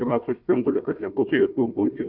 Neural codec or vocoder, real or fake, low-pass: codec, 16 kHz, 1 kbps, FunCodec, trained on Chinese and English, 50 frames a second; fake; 5.4 kHz